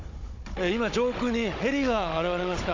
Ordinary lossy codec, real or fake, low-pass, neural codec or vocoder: AAC, 48 kbps; fake; 7.2 kHz; codec, 16 kHz, 16 kbps, FunCodec, trained on Chinese and English, 50 frames a second